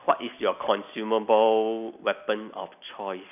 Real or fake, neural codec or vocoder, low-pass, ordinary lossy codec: real; none; 3.6 kHz; none